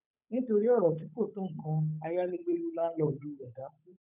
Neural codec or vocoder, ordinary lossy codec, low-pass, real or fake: codec, 16 kHz, 8 kbps, FunCodec, trained on Chinese and English, 25 frames a second; none; 3.6 kHz; fake